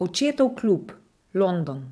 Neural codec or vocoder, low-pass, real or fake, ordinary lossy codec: vocoder, 22.05 kHz, 80 mel bands, WaveNeXt; none; fake; none